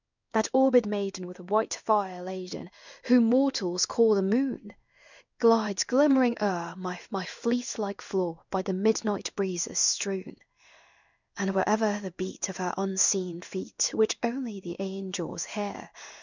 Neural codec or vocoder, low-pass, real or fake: codec, 16 kHz in and 24 kHz out, 1 kbps, XY-Tokenizer; 7.2 kHz; fake